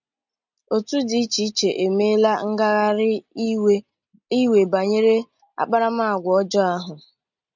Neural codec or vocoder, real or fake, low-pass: none; real; 7.2 kHz